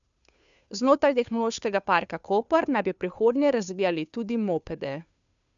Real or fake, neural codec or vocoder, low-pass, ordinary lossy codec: fake; codec, 16 kHz, 2 kbps, FunCodec, trained on Chinese and English, 25 frames a second; 7.2 kHz; MP3, 96 kbps